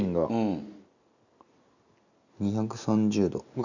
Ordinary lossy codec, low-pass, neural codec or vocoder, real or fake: none; 7.2 kHz; none; real